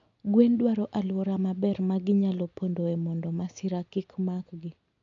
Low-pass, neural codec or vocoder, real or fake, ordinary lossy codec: 7.2 kHz; none; real; none